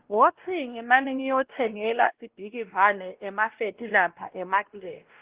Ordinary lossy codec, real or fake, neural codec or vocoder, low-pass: Opus, 16 kbps; fake; codec, 16 kHz, 1 kbps, X-Codec, WavLM features, trained on Multilingual LibriSpeech; 3.6 kHz